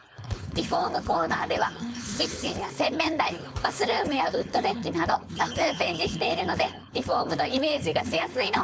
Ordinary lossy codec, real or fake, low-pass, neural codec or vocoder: none; fake; none; codec, 16 kHz, 4.8 kbps, FACodec